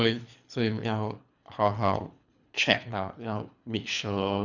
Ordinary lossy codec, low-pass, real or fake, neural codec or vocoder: none; 7.2 kHz; fake; codec, 24 kHz, 3 kbps, HILCodec